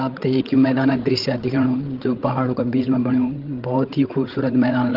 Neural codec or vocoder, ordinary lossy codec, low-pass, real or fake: codec, 16 kHz, 16 kbps, FreqCodec, larger model; Opus, 16 kbps; 5.4 kHz; fake